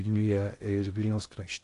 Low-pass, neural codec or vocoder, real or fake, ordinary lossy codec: 10.8 kHz; codec, 16 kHz in and 24 kHz out, 0.6 kbps, FocalCodec, streaming, 2048 codes; fake; AAC, 48 kbps